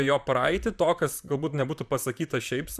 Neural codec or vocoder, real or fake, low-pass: vocoder, 44.1 kHz, 128 mel bands, Pupu-Vocoder; fake; 14.4 kHz